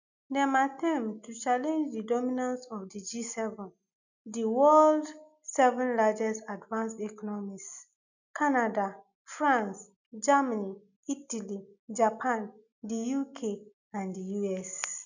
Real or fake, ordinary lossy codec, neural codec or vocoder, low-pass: real; none; none; 7.2 kHz